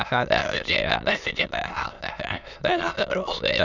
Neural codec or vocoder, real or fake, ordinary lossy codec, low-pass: autoencoder, 22.05 kHz, a latent of 192 numbers a frame, VITS, trained on many speakers; fake; none; 7.2 kHz